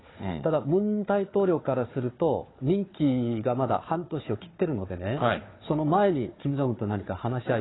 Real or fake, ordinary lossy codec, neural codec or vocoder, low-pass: fake; AAC, 16 kbps; codec, 16 kHz, 4 kbps, FunCodec, trained on Chinese and English, 50 frames a second; 7.2 kHz